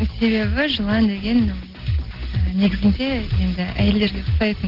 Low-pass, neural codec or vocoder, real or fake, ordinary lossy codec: 5.4 kHz; none; real; Opus, 16 kbps